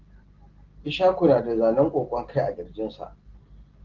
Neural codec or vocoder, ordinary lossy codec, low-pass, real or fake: none; Opus, 16 kbps; 7.2 kHz; real